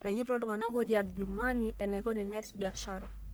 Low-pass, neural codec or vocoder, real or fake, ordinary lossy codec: none; codec, 44.1 kHz, 1.7 kbps, Pupu-Codec; fake; none